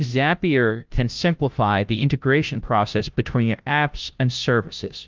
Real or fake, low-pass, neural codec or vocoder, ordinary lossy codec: fake; 7.2 kHz; codec, 16 kHz, 0.5 kbps, FunCodec, trained on Chinese and English, 25 frames a second; Opus, 32 kbps